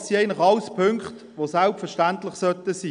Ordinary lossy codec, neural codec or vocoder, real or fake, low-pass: none; none; real; 9.9 kHz